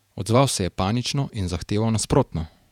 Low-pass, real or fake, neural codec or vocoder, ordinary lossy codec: 19.8 kHz; fake; vocoder, 48 kHz, 128 mel bands, Vocos; none